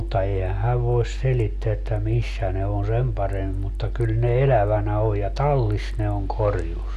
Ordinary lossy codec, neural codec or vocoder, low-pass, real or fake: Opus, 64 kbps; none; 14.4 kHz; real